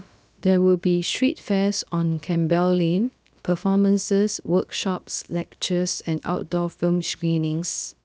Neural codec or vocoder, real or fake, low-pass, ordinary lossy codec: codec, 16 kHz, about 1 kbps, DyCAST, with the encoder's durations; fake; none; none